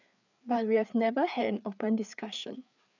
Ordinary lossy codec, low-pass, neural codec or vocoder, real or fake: none; 7.2 kHz; codec, 16 kHz, 4 kbps, FreqCodec, larger model; fake